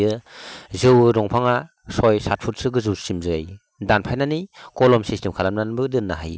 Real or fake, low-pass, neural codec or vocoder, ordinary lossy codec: real; none; none; none